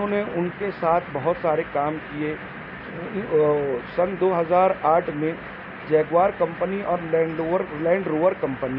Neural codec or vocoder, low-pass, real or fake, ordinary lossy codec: none; 5.4 kHz; real; none